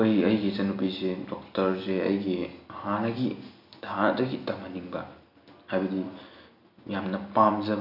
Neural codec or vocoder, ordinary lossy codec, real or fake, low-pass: none; none; real; 5.4 kHz